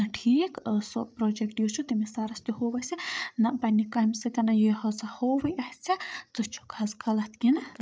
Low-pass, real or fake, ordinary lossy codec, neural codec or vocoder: none; fake; none; codec, 16 kHz, 8 kbps, FreqCodec, larger model